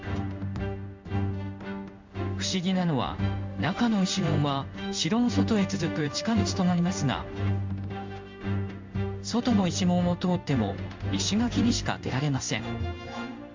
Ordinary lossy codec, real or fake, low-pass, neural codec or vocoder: MP3, 64 kbps; fake; 7.2 kHz; codec, 16 kHz in and 24 kHz out, 1 kbps, XY-Tokenizer